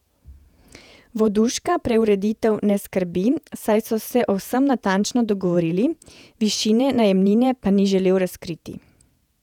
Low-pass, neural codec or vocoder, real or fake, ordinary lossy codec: 19.8 kHz; vocoder, 48 kHz, 128 mel bands, Vocos; fake; none